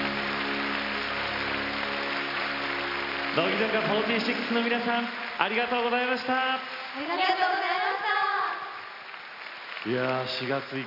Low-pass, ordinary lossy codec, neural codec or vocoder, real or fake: 5.4 kHz; AAC, 48 kbps; none; real